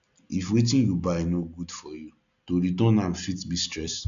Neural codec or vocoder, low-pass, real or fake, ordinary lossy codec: none; 7.2 kHz; real; none